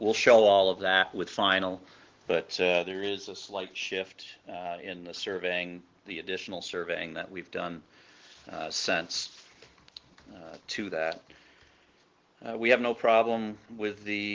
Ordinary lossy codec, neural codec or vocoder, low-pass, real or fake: Opus, 16 kbps; none; 7.2 kHz; real